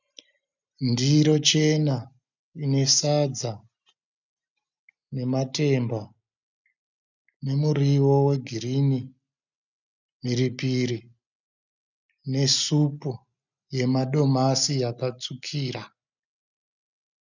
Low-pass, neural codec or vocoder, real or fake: 7.2 kHz; none; real